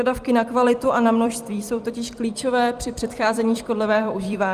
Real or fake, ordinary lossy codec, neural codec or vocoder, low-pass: fake; Opus, 32 kbps; vocoder, 44.1 kHz, 128 mel bands every 256 samples, BigVGAN v2; 14.4 kHz